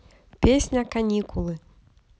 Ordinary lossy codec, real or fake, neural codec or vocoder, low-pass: none; real; none; none